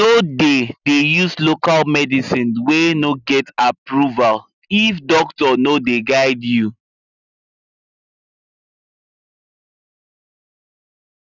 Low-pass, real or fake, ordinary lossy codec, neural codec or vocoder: 7.2 kHz; real; none; none